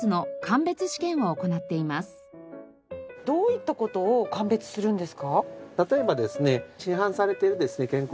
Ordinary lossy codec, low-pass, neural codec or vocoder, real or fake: none; none; none; real